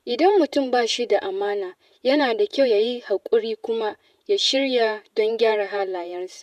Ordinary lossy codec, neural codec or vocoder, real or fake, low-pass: none; vocoder, 48 kHz, 128 mel bands, Vocos; fake; 14.4 kHz